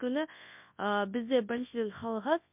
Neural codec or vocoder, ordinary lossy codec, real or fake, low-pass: codec, 24 kHz, 0.9 kbps, WavTokenizer, large speech release; MP3, 32 kbps; fake; 3.6 kHz